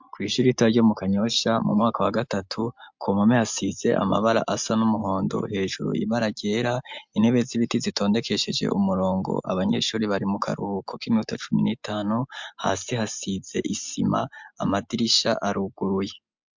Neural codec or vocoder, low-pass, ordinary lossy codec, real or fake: none; 7.2 kHz; MP3, 64 kbps; real